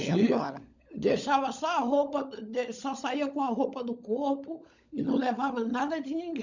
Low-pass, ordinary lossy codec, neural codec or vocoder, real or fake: 7.2 kHz; none; codec, 16 kHz, 16 kbps, FunCodec, trained on LibriTTS, 50 frames a second; fake